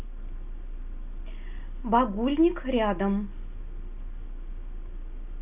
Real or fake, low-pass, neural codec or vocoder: real; 3.6 kHz; none